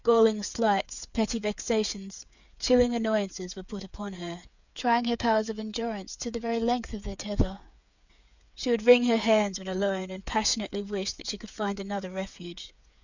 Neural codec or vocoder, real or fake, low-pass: codec, 16 kHz, 8 kbps, FreqCodec, smaller model; fake; 7.2 kHz